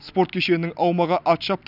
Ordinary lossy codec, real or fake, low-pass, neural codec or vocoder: none; real; 5.4 kHz; none